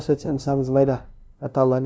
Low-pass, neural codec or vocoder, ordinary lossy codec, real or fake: none; codec, 16 kHz, 0.5 kbps, FunCodec, trained on LibriTTS, 25 frames a second; none; fake